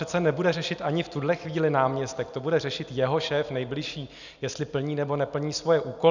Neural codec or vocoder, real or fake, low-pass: none; real; 7.2 kHz